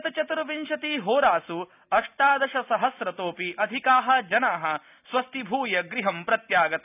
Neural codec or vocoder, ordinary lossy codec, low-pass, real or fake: none; none; 3.6 kHz; real